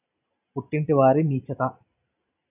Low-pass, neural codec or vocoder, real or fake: 3.6 kHz; none; real